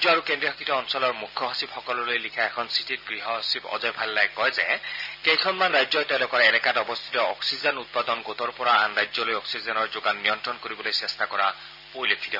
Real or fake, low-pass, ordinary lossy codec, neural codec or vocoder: real; 5.4 kHz; none; none